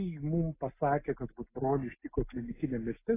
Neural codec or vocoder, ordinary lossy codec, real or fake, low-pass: none; AAC, 16 kbps; real; 3.6 kHz